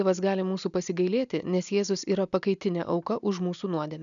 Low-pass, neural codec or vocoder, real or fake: 7.2 kHz; none; real